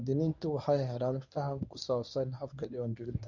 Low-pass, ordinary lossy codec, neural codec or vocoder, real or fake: 7.2 kHz; none; codec, 24 kHz, 0.9 kbps, WavTokenizer, medium speech release version 1; fake